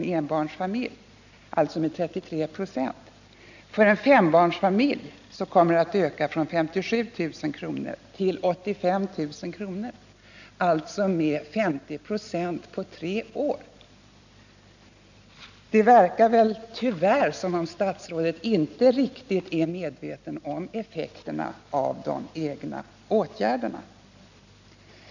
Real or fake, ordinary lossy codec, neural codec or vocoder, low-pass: real; none; none; 7.2 kHz